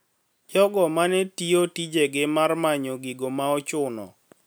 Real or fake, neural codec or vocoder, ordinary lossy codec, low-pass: real; none; none; none